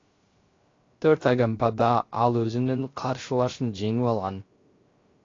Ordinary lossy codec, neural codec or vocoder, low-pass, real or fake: AAC, 32 kbps; codec, 16 kHz, 0.3 kbps, FocalCodec; 7.2 kHz; fake